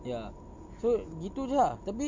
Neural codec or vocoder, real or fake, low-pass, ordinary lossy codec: none; real; 7.2 kHz; MP3, 48 kbps